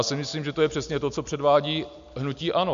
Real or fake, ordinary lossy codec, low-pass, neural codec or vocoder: real; MP3, 64 kbps; 7.2 kHz; none